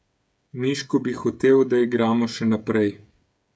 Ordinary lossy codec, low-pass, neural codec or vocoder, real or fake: none; none; codec, 16 kHz, 16 kbps, FreqCodec, smaller model; fake